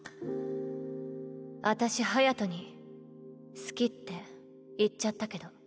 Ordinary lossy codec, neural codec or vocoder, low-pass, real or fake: none; none; none; real